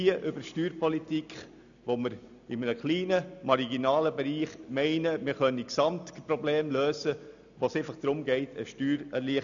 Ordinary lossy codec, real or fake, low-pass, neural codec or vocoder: none; real; 7.2 kHz; none